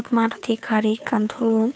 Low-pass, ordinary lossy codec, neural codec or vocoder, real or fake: none; none; codec, 16 kHz, 2 kbps, FunCodec, trained on Chinese and English, 25 frames a second; fake